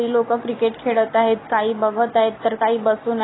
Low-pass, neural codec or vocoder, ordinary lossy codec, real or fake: 7.2 kHz; none; AAC, 16 kbps; real